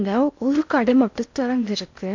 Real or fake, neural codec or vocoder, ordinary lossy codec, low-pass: fake; codec, 16 kHz in and 24 kHz out, 0.6 kbps, FocalCodec, streaming, 2048 codes; MP3, 64 kbps; 7.2 kHz